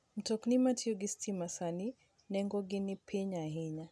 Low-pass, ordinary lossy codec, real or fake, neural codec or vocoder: none; none; real; none